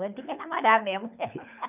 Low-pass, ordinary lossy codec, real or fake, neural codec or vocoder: 3.6 kHz; none; fake; codec, 24 kHz, 6 kbps, HILCodec